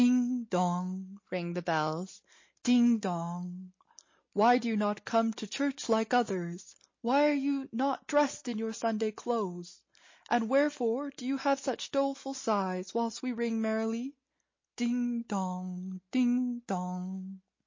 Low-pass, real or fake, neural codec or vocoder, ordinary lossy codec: 7.2 kHz; real; none; MP3, 32 kbps